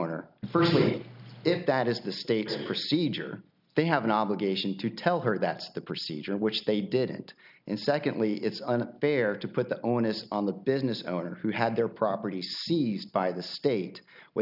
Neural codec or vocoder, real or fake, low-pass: none; real; 5.4 kHz